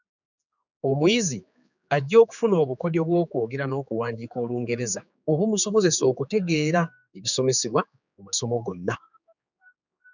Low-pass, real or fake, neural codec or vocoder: 7.2 kHz; fake; codec, 16 kHz, 4 kbps, X-Codec, HuBERT features, trained on general audio